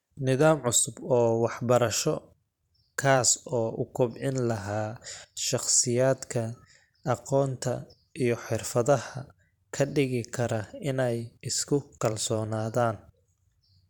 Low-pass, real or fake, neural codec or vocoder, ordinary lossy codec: 19.8 kHz; fake; vocoder, 44.1 kHz, 128 mel bands every 512 samples, BigVGAN v2; none